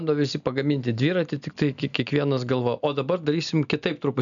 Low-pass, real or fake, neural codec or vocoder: 7.2 kHz; real; none